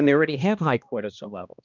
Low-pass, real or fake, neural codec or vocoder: 7.2 kHz; fake; codec, 16 kHz, 1 kbps, X-Codec, HuBERT features, trained on balanced general audio